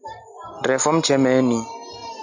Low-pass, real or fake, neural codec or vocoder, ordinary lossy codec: 7.2 kHz; real; none; AAC, 48 kbps